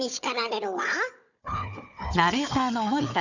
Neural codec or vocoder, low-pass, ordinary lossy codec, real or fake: codec, 16 kHz, 4 kbps, FunCodec, trained on Chinese and English, 50 frames a second; 7.2 kHz; none; fake